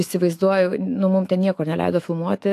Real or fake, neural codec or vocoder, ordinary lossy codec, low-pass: fake; autoencoder, 48 kHz, 128 numbers a frame, DAC-VAE, trained on Japanese speech; AAC, 64 kbps; 14.4 kHz